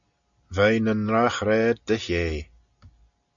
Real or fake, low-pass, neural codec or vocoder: real; 7.2 kHz; none